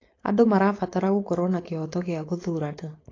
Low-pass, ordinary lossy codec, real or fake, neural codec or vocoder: 7.2 kHz; AAC, 32 kbps; fake; codec, 16 kHz, 4.8 kbps, FACodec